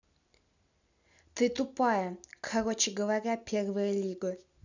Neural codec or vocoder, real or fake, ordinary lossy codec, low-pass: none; real; none; 7.2 kHz